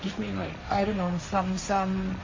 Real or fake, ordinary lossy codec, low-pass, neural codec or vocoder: fake; MP3, 32 kbps; 7.2 kHz; codec, 16 kHz, 1.1 kbps, Voila-Tokenizer